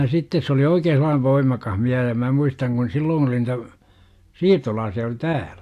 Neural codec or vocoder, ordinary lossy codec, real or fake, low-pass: none; AAC, 64 kbps; real; 14.4 kHz